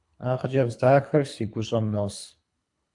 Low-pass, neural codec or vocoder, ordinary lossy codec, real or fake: 10.8 kHz; codec, 24 kHz, 3 kbps, HILCodec; AAC, 64 kbps; fake